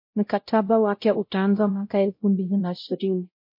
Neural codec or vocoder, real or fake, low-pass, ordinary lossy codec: codec, 16 kHz, 0.5 kbps, X-Codec, WavLM features, trained on Multilingual LibriSpeech; fake; 5.4 kHz; MP3, 32 kbps